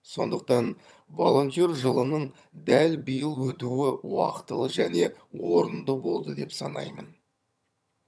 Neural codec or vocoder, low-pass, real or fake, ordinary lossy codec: vocoder, 22.05 kHz, 80 mel bands, HiFi-GAN; none; fake; none